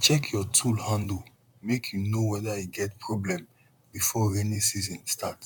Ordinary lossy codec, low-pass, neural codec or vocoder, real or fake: none; 19.8 kHz; vocoder, 44.1 kHz, 128 mel bands, Pupu-Vocoder; fake